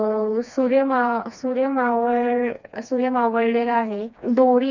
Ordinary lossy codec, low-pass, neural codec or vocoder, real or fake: none; 7.2 kHz; codec, 16 kHz, 2 kbps, FreqCodec, smaller model; fake